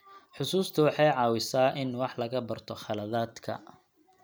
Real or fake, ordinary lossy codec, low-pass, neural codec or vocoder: real; none; none; none